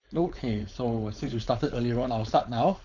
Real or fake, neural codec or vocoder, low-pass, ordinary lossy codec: fake; codec, 16 kHz, 4.8 kbps, FACodec; 7.2 kHz; none